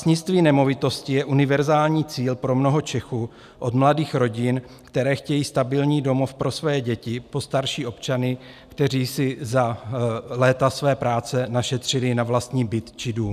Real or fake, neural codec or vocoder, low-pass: real; none; 14.4 kHz